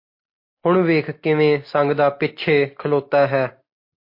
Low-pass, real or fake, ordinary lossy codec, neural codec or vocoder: 5.4 kHz; real; MP3, 32 kbps; none